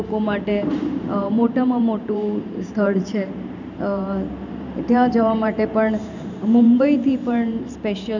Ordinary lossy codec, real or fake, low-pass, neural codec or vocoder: none; fake; 7.2 kHz; vocoder, 44.1 kHz, 128 mel bands every 512 samples, BigVGAN v2